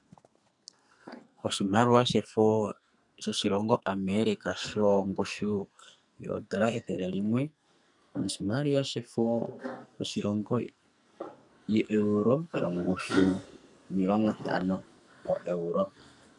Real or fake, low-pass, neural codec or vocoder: fake; 10.8 kHz; codec, 44.1 kHz, 2.6 kbps, SNAC